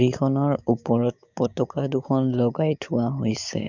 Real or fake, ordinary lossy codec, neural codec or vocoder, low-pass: fake; none; codec, 16 kHz, 6 kbps, DAC; 7.2 kHz